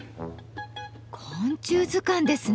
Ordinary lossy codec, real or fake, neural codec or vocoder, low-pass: none; real; none; none